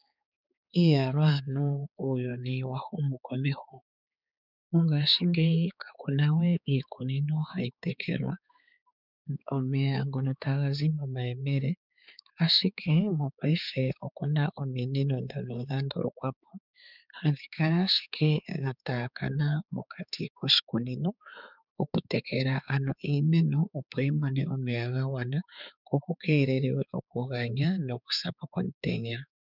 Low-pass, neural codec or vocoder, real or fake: 5.4 kHz; codec, 16 kHz, 4 kbps, X-Codec, HuBERT features, trained on balanced general audio; fake